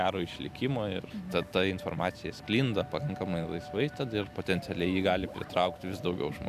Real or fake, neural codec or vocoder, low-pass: real; none; 14.4 kHz